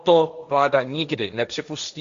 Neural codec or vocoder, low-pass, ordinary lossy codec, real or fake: codec, 16 kHz, 1.1 kbps, Voila-Tokenizer; 7.2 kHz; Opus, 64 kbps; fake